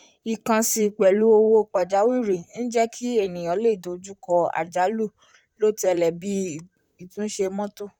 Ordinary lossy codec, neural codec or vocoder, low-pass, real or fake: none; vocoder, 44.1 kHz, 128 mel bands, Pupu-Vocoder; 19.8 kHz; fake